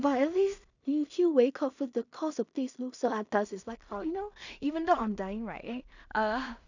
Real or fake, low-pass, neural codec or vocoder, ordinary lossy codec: fake; 7.2 kHz; codec, 16 kHz in and 24 kHz out, 0.4 kbps, LongCat-Audio-Codec, two codebook decoder; none